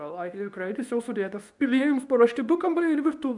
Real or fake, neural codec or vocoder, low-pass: fake; codec, 24 kHz, 0.9 kbps, WavTokenizer, medium speech release version 2; 10.8 kHz